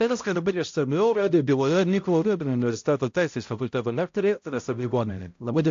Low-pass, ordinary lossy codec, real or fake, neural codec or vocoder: 7.2 kHz; AAC, 48 kbps; fake; codec, 16 kHz, 0.5 kbps, X-Codec, HuBERT features, trained on balanced general audio